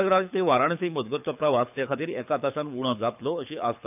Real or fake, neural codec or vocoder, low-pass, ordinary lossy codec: fake; codec, 24 kHz, 6 kbps, HILCodec; 3.6 kHz; none